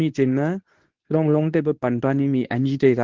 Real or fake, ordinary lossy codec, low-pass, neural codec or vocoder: fake; Opus, 16 kbps; 7.2 kHz; codec, 24 kHz, 0.9 kbps, WavTokenizer, medium speech release version 2